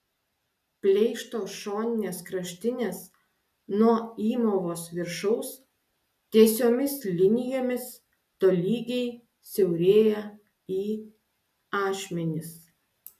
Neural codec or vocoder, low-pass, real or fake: none; 14.4 kHz; real